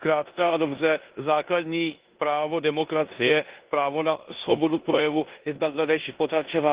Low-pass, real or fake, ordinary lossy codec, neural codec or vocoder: 3.6 kHz; fake; Opus, 16 kbps; codec, 16 kHz in and 24 kHz out, 0.9 kbps, LongCat-Audio-Codec, four codebook decoder